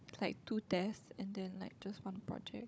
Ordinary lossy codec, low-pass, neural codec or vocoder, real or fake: none; none; codec, 16 kHz, 16 kbps, FunCodec, trained on Chinese and English, 50 frames a second; fake